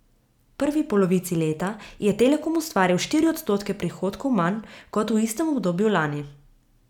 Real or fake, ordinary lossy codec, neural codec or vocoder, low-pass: real; none; none; 19.8 kHz